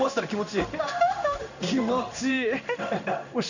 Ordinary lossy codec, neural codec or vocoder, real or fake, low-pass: none; codec, 16 kHz in and 24 kHz out, 1 kbps, XY-Tokenizer; fake; 7.2 kHz